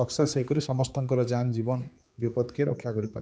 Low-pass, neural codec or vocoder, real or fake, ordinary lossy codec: none; codec, 16 kHz, 4 kbps, X-Codec, HuBERT features, trained on general audio; fake; none